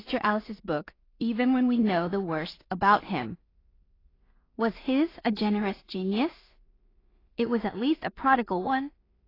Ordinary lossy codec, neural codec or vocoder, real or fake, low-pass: AAC, 24 kbps; codec, 16 kHz in and 24 kHz out, 0.4 kbps, LongCat-Audio-Codec, two codebook decoder; fake; 5.4 kHz